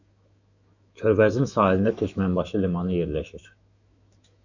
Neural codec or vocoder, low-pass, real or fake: autoencoder, 48 kHz, 128 numbers a frame, DAC-VAE, trained on Japanese speech; 7.2 kHz; fake